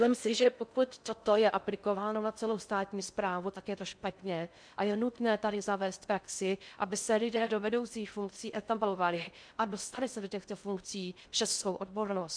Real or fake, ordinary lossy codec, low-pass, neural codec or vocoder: fake; MP3, 96 kbps; 9.9 kHz; codec, 16 kHz in and 24 kHz out, 0.6 kbps, FocalCodec, streaming, 4096 codes